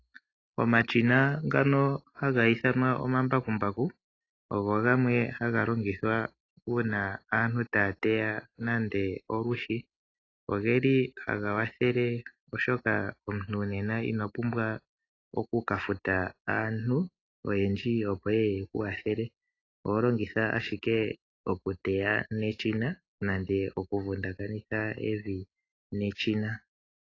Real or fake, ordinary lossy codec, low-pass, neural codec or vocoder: real; AAC, 32 kbps; 7.2 kHz; none